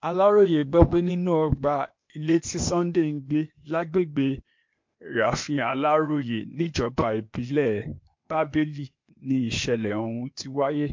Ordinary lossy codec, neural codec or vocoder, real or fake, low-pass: MP3, 48 kbps; codec, 16 kHz, 0.8 kbps, ZipCodec; fake; 7.2 kHz